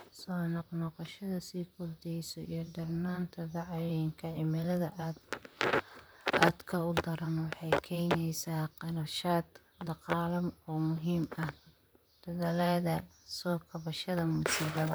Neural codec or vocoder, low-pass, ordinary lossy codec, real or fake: vocoder, 44.1 kHz, 128 mel bands, Pupu-Vocoder; none; none; fake